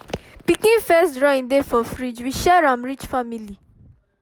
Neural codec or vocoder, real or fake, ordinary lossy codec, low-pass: none; real; none; none